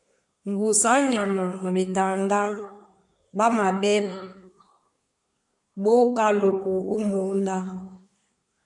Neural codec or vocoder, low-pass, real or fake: codec, 24 kHz, 1 kbps, SNAC; 10.8 kHz; fake